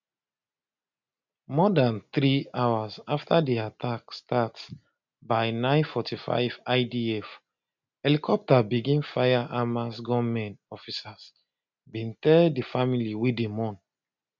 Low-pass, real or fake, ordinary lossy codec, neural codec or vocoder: 7.2 kHz; real; none; none